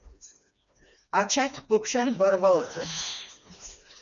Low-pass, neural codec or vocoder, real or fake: 7.2 kHz; codec, 16 kHz, 2 kbps, FreqCodec, smaller model; fake